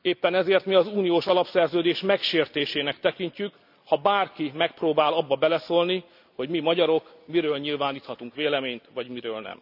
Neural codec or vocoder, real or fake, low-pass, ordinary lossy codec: none; real; 5.4 kHz; none